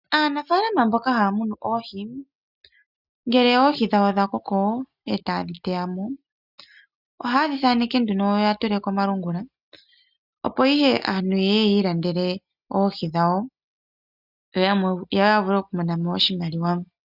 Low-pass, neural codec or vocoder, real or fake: 5.4 kHz; none; real